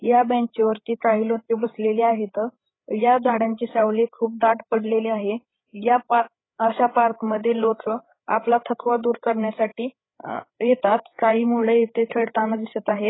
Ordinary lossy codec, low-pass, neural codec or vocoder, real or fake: AAC, 16 kbps; 7.2 kHz; codec, 16 kHz, 8 kbps, FreqCodec, larger model; fake